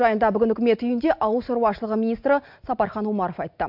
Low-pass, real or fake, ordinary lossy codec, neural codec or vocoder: 5.4 kHz; real; MP3, 48 kbps; none